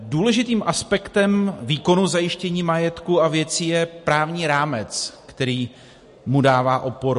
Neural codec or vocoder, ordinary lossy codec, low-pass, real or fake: none; MP3, 48 kbps; 14.4 kHz; real